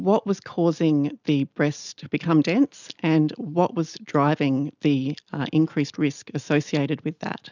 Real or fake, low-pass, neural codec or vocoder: real; 7.2 kHz; none